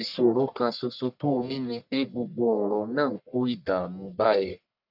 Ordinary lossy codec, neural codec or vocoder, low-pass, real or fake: none; codec, 44.1 kHz, 1.7 kbps, Pupu-Codec; 5.4 kHz; fake